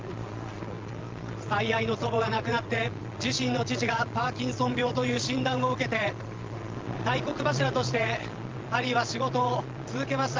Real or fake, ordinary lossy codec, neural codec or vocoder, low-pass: fake; Opus, 32 kbps; vocoder, 22.05 kHz, 80 mel bands, Vocos; 7.2 kHz